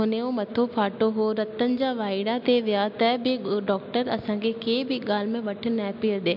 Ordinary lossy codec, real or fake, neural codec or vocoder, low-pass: MP3, 48 kbps; real; none; 5.4 kHz